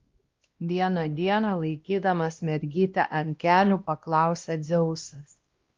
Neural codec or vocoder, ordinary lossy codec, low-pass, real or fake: codec, 16 kHz, 1 kbps, X-Codec, WavLM features, trained on Multilingual LibriSpeech; Opus, 32 kbps; 7.2 kHz; fake